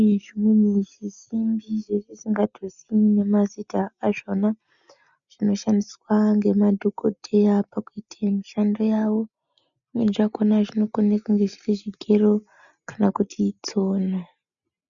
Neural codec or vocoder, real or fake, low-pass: none; real; 7.2 kHz